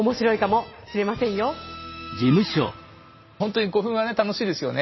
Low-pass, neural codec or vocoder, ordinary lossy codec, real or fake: 7.2 kHz; none; MP3, 24 kbps; real